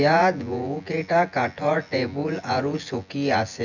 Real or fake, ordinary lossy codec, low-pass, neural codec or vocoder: fake; none; 7.2 kHz; vocoder, 24 kHz, 100 mel bands, Vocos